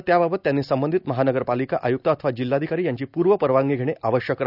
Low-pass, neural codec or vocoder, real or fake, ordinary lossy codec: 5.4 kHz; none; real; none